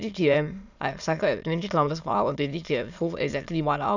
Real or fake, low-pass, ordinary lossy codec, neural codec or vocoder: fake; 7.2 kHz; none; autoencoder, 22.05 kHz, a latent of 192 numbers a frame, VITS, trained on many speakers